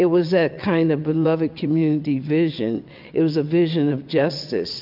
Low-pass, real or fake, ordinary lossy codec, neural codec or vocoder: 5.4 kHz; fake; MP3, 48 kbps; vocoder, 22.05 kHz, 80 mel bands, WaveNeXt